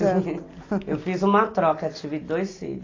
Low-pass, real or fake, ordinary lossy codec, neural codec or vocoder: 7.2 kHz; real; AAC, 32 kbps; none